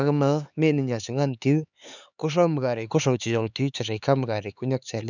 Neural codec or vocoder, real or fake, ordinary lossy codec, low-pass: codec, 16 kHz, 4 kbps, X-Codec, HuBERT features, trained on LibriSpeech; fake; none; 7.2 kHz